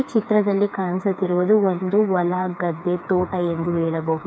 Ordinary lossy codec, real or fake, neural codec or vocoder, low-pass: none; fake; codec, 16 kHz, 4 kbps, FreqCodec, smaller model; none